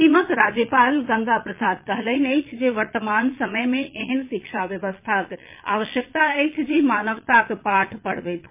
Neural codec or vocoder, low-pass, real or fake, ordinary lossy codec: vocoder, 22.05 kHz, 80 mel bands, Vocos; 3.6 kHz; fake; MP3, 24 kbps